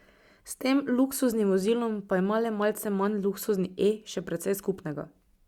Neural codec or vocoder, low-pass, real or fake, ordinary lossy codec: none; 19.8 kHz; real; Opus, 64 kbps